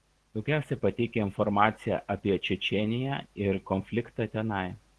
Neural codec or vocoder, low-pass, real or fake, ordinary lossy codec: none; 10.8 kHz; real; Opus, 16 kbps